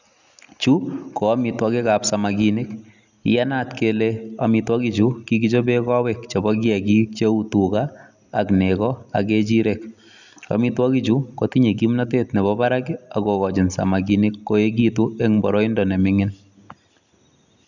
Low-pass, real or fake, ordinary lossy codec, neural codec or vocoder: 7.2 kHz; real; none; none